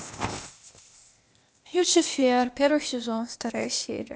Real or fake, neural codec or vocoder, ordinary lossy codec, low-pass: fake; codec, 16 kHz, 0.8 kbps, ZipCodec; none; none